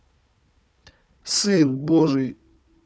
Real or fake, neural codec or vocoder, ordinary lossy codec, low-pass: fake; codec, 16 kHz, 4 kbps, FunCodec, trained on Chinese and English, 50 frames a second; none; none